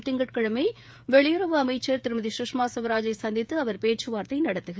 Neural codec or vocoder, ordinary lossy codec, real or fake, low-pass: codec, 16 kHz, 16 kbps, FreqCodec, smaller model; none; fake; none